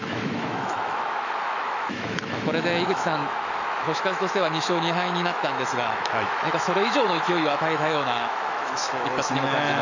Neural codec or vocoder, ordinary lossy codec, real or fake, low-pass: autoencoder, 48 kHz, 128 numbers a frame, DAC-VAE, trained on Japanese speech; none; fake; 7.2 kHz